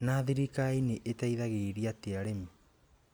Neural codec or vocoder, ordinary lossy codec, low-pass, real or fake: none; none; none; real